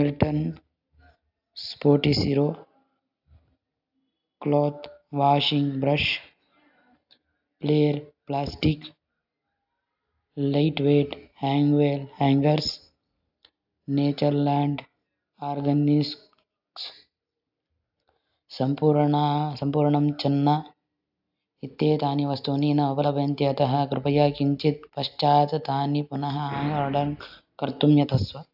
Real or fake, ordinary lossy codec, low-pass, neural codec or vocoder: real; AAC, 48 kbps; 5.4 kHz; none